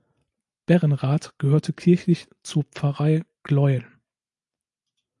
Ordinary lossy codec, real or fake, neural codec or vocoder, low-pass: AAC, 64 kbps; real; none; 10.8 kHz